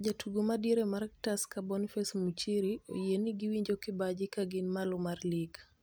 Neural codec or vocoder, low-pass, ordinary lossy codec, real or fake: none; none; none; real